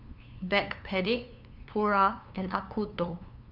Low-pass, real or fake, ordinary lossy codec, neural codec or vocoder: 5.4 kHz; fake; none; codec, 16 kHz, 2 kbps, FunCodec, trained on LibriTTS, 25 frames a second